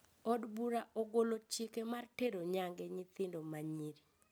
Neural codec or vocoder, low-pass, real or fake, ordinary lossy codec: none; none; real; none